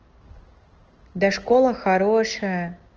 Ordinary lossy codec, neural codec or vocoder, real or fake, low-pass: Opus, 16 kbps; none; real; 7.2 kHz